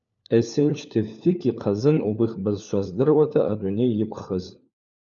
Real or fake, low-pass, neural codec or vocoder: fake; 7.2 kHz; codec, 16 kHz, 4 kbps, FunCodec, trained on LibriTTS, 50 frames a second